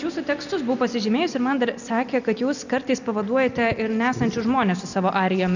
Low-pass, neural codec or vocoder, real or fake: 7.2 kHz; none; real